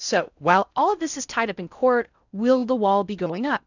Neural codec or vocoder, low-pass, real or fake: codec, 16 kHz in and 24 kHz out, 0.6 kbps, FocalCodec, streaming, 4096 codes; 7.2 kHz; fake